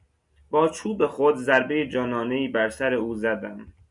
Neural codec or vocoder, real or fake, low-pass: none; real; 10.8 kHz